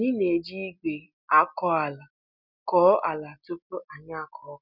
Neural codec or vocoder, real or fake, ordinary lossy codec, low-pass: none; real; none; 5.4 kHz